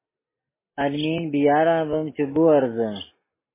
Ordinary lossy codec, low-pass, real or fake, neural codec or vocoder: MP3, 16 kbps; 3.6 kHz; real; none